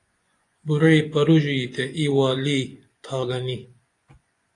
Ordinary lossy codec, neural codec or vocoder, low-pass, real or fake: AAC, 48 kbps; vocoder, 24 kHz, 100 mel bands, Vocos; 10.8 kHz; fake